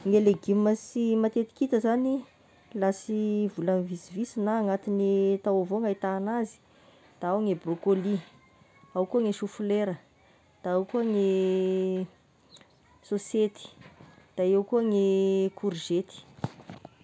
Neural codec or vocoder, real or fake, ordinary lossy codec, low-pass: none; real; none; none